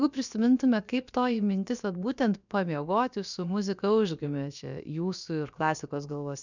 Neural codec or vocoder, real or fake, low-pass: codec, 16 kHz, about 1 kbps, DyCAST, with the encoder's durations; fake; 7.2 kHz